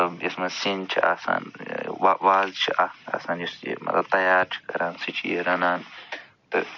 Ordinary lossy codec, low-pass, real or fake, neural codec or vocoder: none; 7.2 kHz; real; none